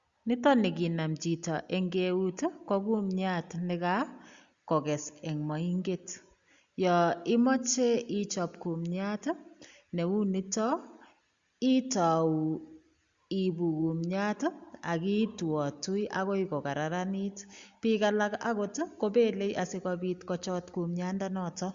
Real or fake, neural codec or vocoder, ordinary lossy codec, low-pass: real; none; Opus, 64 kbps; 7.2 kHz